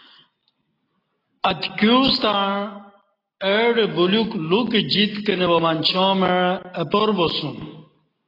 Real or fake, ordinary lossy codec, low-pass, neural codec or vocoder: real; AAC, 24 kbps; 5.4 kHz; none